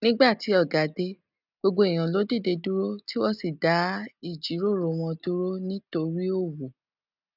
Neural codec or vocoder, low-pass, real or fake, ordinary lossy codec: none; 5.4 kHz; real; none